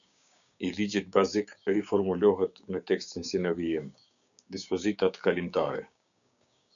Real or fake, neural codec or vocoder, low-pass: fake; codec, 16 kHz, 6 kbps, DAC; 7.2 kHz